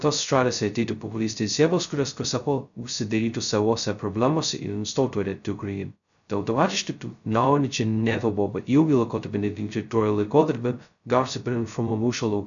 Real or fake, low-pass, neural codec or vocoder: fake; 7.2 kHz; codec, 16 kHz, 0.2 kbps, FocalCodec